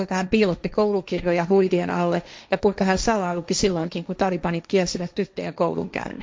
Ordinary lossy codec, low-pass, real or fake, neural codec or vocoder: none; none; fake; codec, 16 kHz, 1.1 kbps, Voila-Tokenizer